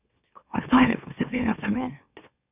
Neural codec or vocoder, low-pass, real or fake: autoencoder, 44.1 kHz, a latent of 192 numbers a frame, MeloTTS; 3.6 kHz; fake